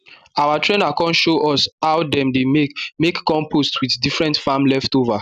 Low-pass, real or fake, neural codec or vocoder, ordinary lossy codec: 14.4 kHz; real; none; none